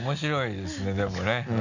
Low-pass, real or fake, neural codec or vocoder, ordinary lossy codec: 7.2 kHz; real; none; none